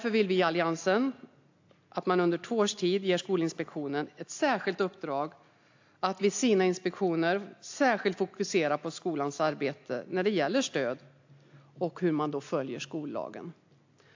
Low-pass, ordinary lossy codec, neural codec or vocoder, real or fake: 7.2 kHz; AAC, 48 kbps; none; real